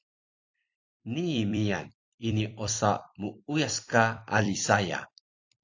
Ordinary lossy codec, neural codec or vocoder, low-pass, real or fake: AAC, 48 kbps; vocoder, 44.1 kHz, 128 mel bands every 256 samples, BigVGAN v2; 7.2 kHz; fake